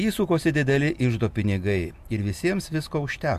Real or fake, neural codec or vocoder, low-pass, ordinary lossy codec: real; none; 14.4 kHz; AAC, 96 kbps